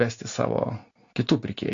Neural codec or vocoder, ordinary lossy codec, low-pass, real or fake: none; AAC, 48 kbps; 7.2 kHz; real